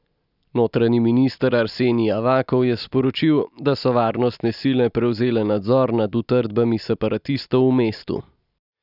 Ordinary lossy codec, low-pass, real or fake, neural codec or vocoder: none; 5.4 kHz; real; none